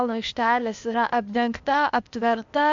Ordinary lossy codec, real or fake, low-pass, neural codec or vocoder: MP3, 64 kbps; fake; 7.2 kHz; codec, 16 kHz, 0.8 kbps, ZipCodec